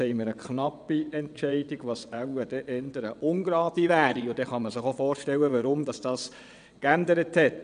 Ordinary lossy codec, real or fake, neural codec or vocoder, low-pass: none; fake; vocoder, 22.05 kHz, 80 mel bands, WaveNeXt; 9.9 kHz